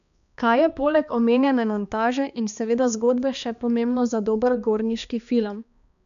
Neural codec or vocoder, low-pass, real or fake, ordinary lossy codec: codec, 16 kHz, 2 kbps, X-Codec, HuBERT features, trained on balanced general audio; 7.2 kHz; fake; none